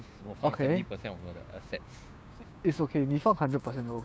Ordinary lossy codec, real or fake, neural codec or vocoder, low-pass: none; fake; codec, 16 kHz, 6 kbps, DAC; none